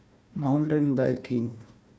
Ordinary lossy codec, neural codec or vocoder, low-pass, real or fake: none; codec, 16 kHz, 1 kbps, FunCodec, trained on Chinese and English, 50 frames a second; none; fake